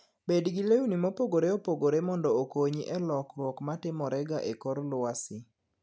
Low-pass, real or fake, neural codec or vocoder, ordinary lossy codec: none; real; none; none